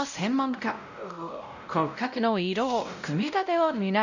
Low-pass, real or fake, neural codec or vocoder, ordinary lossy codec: 7.2 kHz; fake; codec, 16 kHz, 0.5 kbps, X-Codec, WavLM features, trained on Multilingual LibriSpeech; none